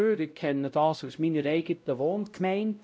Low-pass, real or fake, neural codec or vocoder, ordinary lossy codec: none; fake; codec, 16 kHz, 0.5 kbps, X-Codec, WavLM features, trained on Multilingual LibriSpeech; none